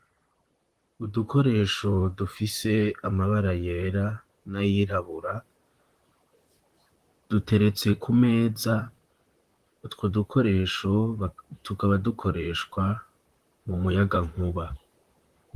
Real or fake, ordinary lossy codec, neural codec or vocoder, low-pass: fake; Opus, 24 kbps; vocoder, 44.1 kHz, 128 mel bands, Pupu-Vocoder; 14.4 kHz